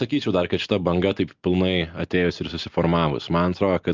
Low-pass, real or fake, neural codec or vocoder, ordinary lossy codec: 7.2 kHz; real; none; Opus, 24 kbps